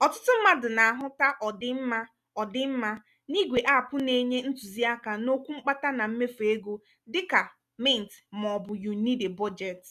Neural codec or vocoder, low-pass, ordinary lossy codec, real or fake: none; 14.4 kHz; none; real